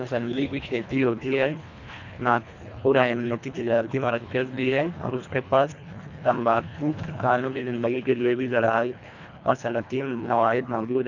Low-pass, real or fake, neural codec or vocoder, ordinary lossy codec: 7.2 kHz; fake; codec, 24 kHz, 1.5 kbps, HILCodec; none